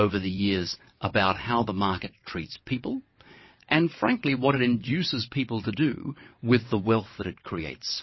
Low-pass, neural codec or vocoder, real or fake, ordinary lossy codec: 7.2 kHz; vocoder, 22.05 kHz, 80 mel bands, WaveNeXt; fake; MP3, 24 kbps